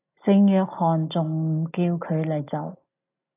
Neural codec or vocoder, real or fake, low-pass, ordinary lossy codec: none; real; 3.6 kHz; AAC, 24 kbps